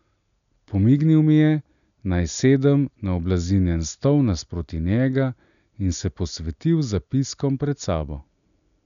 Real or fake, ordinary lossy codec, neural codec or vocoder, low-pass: real; none; none; 7.2 kHz